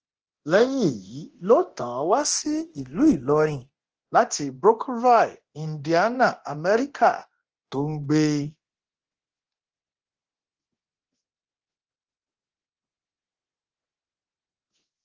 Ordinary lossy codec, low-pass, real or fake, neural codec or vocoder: Opus, 16 kbps; 7.2 kHz; fake; codec, 24 kHz, 0.9 kbps, DualCodec